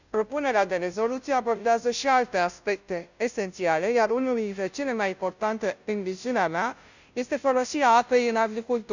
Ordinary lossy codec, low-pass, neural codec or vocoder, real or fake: none; 7.2 kHz; codec, 16 kHz, 0.5 kbps, FunCodec, trained on Chinese and English, 25 frames a second; fake